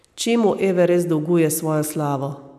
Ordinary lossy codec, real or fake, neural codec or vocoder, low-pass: none; real; none; 14.4 kHz